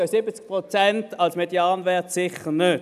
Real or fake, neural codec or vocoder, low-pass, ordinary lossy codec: real; none; 14.4 kHz; none